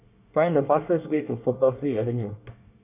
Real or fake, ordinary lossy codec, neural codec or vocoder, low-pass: fake; none; codec, 24 kHz, 1 kbps, SNAC; 3.6 kHz